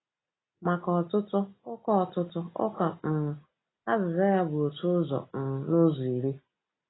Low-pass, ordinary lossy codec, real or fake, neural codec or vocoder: 7.2 kHz; AAC, 16 kbps; real; none